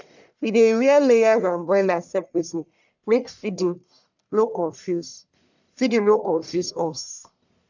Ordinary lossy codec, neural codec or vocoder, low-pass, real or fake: none; codec, 44.1 kHz, 1.7 kbps, Pupu-Codec; 7.2 kHz; fake